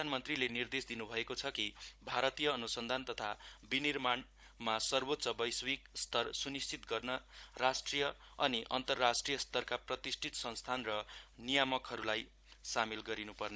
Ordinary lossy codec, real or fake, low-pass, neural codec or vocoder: none; fake; none; codec, 16 kHz, 16 kbps, FunCodec, trained on Chinese and English, 50 frames a second